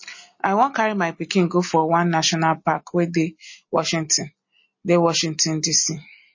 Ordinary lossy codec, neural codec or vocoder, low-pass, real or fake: MP3, 32 kbps; none; 7.2 kHz; real